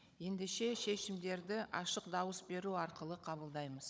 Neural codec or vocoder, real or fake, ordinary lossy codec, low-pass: codec, 16 kHz, 16 kbps, FunCodec, trained on Chinese and English, 50 frames a second; fake; none; none